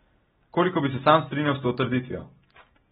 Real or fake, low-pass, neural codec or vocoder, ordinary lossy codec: real; 19.8 kHz; none; AAC, 16 kbps